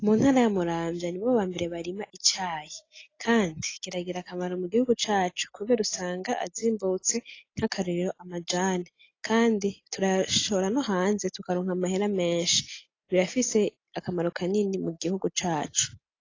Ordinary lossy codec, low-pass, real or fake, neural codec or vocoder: AAC, 32 kbps; 7.2 kHz; real; none